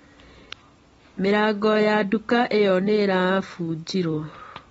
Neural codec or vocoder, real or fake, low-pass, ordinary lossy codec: none; real; 19.8 kHz; AAC, 24 kbps